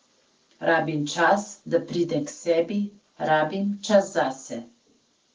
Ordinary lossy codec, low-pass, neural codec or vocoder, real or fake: Opus, 16 kbps; 7.2 kHz; none; real